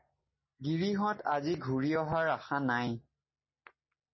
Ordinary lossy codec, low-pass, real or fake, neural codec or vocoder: MP3, 24 kbps; 7.2 kHz; real; none